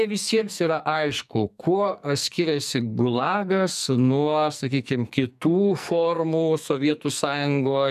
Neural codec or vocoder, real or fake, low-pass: codec, 44.1 kHz, 2.6 kbps, SNAC; fake; 14.4 kHz